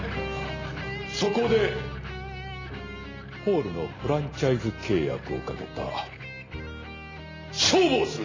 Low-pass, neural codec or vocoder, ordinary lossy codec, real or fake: 7.2 kHz; none; AAC, 32 kbps; real